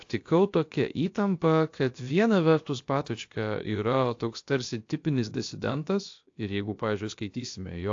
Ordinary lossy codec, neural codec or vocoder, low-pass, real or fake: AAC, 48 kbps; codec, 16 kHz, about 1 kbps, DyCAST, with the encoder's durations; 7.2 kHz; fake